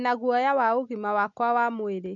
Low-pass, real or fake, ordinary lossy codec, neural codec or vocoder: 7.2 kHz; real; none; none